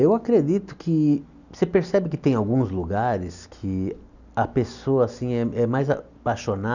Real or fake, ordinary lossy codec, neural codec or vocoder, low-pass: real; none; none; 7.2 kHz